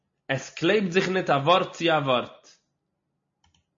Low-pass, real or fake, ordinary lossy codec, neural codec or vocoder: 7.2 kHz; real; MP3, 32 kbps; none